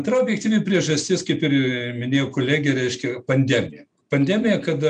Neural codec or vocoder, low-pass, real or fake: none; 9.9 kHz; real